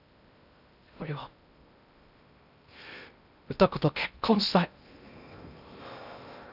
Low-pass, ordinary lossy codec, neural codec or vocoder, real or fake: 5.4 kHz; none; codec, 16 kHz in and 24 kHz out, 0.6 kbps, FocalCodec, streaming, 2048 codes; fake